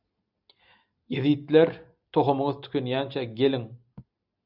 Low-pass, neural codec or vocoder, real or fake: 5.4 kHz; none; real